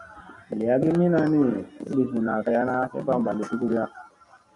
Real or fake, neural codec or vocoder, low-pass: real; none; 10.8 kHz